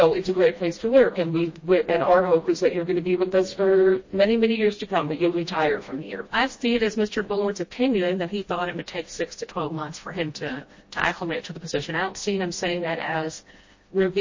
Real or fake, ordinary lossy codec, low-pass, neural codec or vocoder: fake; MP3, 32 kbps; 7.2 kHz; codec, 16 kHz, 1 kbps, FreqCodec, smaller model